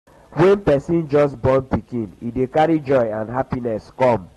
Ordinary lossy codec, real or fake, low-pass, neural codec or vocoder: AAC, 32 kbps; real; 19.8 kHz; none